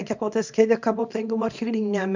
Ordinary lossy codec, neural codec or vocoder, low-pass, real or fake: MP3, 64 kbps; codec, 24 kHz, 0.9 kbps, WavTokenizer, small release; 7.2 kHz; fake